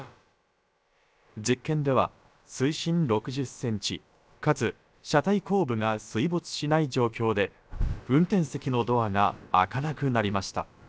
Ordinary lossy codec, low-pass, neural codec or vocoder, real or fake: none; none; codec, 16 kHz, about 1 kbps, DyCAST, with the encoder's durations; fake